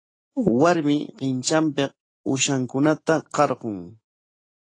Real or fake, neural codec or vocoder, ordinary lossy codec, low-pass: fake; autoencoder, 48 kHz, 128 numbers a frame, DAC-VAE, trained on Japanese speech; AAC, 32 kbps; 9.9 kHz